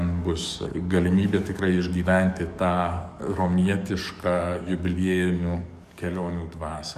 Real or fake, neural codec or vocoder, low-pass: fake; codec, 44.1 kHz, 7.8 kbps, DAC; 14.4 kHz